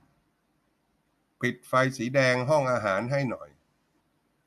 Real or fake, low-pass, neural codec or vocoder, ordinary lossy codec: real; 14.4 kHz; none; none